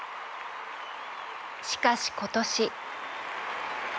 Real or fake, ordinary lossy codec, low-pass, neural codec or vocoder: real; none; none; none